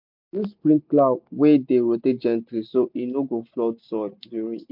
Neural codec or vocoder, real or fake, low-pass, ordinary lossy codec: none; real; 5.4 kHz; MP3, 48 kbps